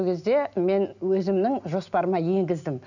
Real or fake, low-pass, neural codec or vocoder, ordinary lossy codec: real; 7.2 kHz; none; none